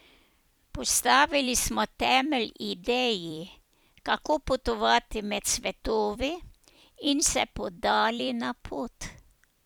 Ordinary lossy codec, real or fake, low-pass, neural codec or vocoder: none; fake; none; vocoder, 44.1 kHz, 128 mel bands every 256 samples, BigVGAN v2